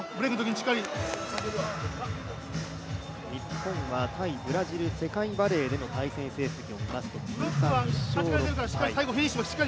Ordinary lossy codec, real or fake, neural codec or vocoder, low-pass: none; real; none; none